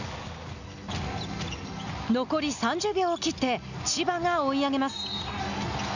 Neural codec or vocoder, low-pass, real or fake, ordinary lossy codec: none; 7.2 kHz; real; none